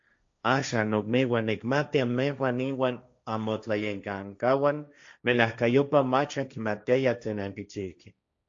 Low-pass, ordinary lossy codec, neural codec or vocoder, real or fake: 7.2 kHz; AAC, 64 kbps; codec, 16 kHz, 1.1 kbps, Voila-Tokenizer; fake